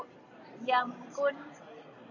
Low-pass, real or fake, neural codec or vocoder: 7.2 kHz; real; none